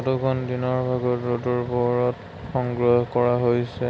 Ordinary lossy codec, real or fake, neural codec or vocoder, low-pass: none; real; none; none